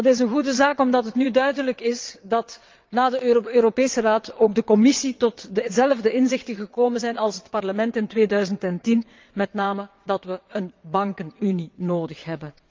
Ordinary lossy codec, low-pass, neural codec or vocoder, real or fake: Opus, 24 kbps; 7.2 kHz; vocoder, 22.05 kHz, 80 mel bands, WaveNeXt; fake